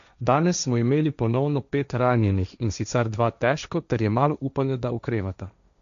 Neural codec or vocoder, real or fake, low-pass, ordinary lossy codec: codec, 16 kHz, 1.1 kbps, Voila-Tokenizer; fake; 7.2 kHz; none